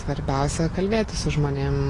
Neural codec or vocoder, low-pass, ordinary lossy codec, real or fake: none; 10.8 kHz; AAC, 32 kbps; real